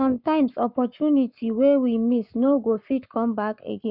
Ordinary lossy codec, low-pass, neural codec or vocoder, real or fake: none; 5.4 kHz; codec, 44.1 kHz, 7.8 kbps, Pupu-Codec; fake